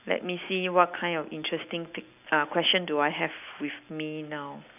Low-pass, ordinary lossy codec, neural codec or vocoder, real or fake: 3.6 kHz; none; none; real